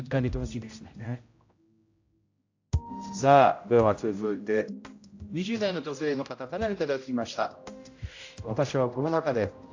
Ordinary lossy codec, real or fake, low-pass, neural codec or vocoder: AAC, 48 kbps; fake; 7.2 kHz; codec, 16 kHz, 0.5 kbps, X-Codec, HuBERT features, trained on general audio